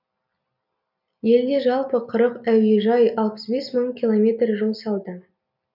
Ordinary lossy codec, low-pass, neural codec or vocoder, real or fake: none; 5.4 kHz; none; real